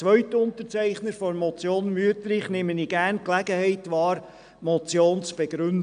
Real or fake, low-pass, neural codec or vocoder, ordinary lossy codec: real; 9.9 kHz; none; none